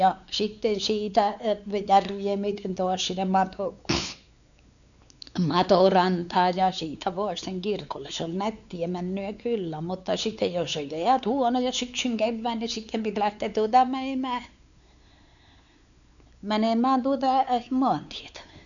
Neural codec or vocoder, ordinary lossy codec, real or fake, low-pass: codec, 16 kHz, 4 kbps, X-Codec, WavLM features, trained on Multilingual LibriSpeech; none; fake; 7.2 kHz